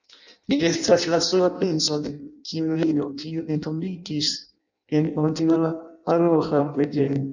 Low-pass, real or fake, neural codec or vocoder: 7.2 kHz; fake; codec, 16 kHz in and 24 kHz out, 0.6 kbps, FireRedTTS-2 codec